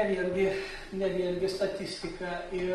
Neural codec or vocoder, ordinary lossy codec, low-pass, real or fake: none; Opus, 24 kbps; 10.8 kHz; real